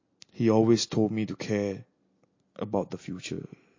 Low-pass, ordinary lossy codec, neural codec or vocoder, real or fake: 7.2 kHz; MP3, 32 kbps; none; real